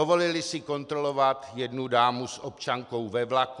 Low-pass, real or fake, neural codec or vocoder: 10.8 kHz; real; none